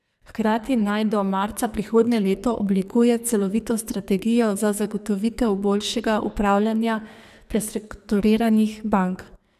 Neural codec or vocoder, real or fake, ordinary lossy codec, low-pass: codec, 44.1 kHz, 2.6 kbps, SNAC; fake; none; 14.4 kHz